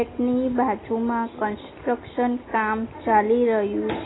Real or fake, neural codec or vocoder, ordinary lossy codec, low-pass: real; none; AAC, 16 kbps; 7.2 kHz